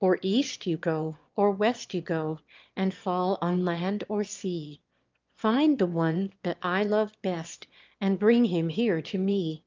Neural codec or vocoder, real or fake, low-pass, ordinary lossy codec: autoencoder, 22.05 kHz, a latent of 192 numbers a frame, VITS, trained on one speaker; fake; 7.2 kHz; Opus, 24 kbps